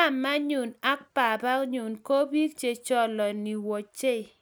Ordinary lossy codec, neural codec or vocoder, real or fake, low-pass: none; none; real; none